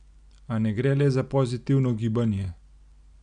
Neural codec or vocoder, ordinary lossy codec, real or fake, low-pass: none; none; real; 9.9 kHz